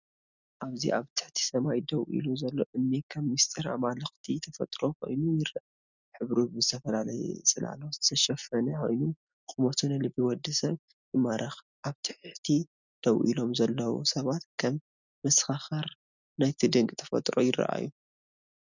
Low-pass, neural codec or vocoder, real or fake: 7.2 kHz; none; real